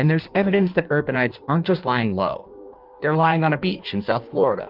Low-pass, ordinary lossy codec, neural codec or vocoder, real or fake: 5.4 kHz; Opus, 24 kbps; codec, 16 kHz in and 24 kHz out, 1.1 kbps, FireRedTTS-2 codec; fake